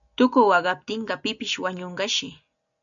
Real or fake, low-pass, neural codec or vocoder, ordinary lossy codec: real; 7.2 kHz; none; MP3, 96 kbps